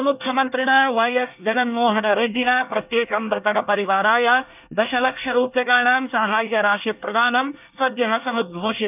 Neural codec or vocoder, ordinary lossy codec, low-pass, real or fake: codec, 24 kHz, 1 kbps, SNAC; none; 3.6 kHz; fake